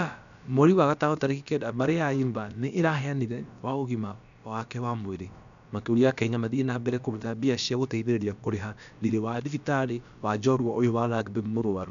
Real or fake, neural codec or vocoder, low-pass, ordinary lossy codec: fake; codec, 16 kHz, about 1 kbps, DyCAST, with the encoder's durations; 7.2 kHz; none